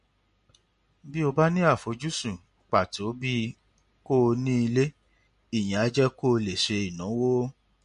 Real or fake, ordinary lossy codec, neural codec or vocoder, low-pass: real; MP3, 48 kbps; none; 10.8 kHz